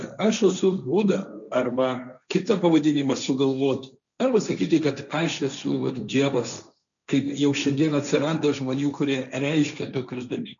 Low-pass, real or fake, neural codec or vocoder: 7.2 kHz; fake; codec, 16 kHz, 1.1 kbps, Voila-Tokenizer